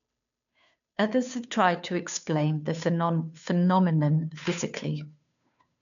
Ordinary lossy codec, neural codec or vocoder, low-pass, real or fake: none; codec, 16 kHz, 2 kbps, FunCodec, trained on Chinese and English, 25 frames a second; 7.2 kHz; fake